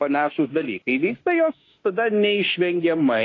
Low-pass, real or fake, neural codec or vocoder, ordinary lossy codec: 7.2 kHz; fake; autoencoder, 48 kHz, 32 numbers a frame, DAC-VAE, trained on Japanese speech; AAC, 32 kbps